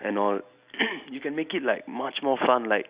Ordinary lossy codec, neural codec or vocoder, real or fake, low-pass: Opus, 64 kbps; none; real; 3.6 kHz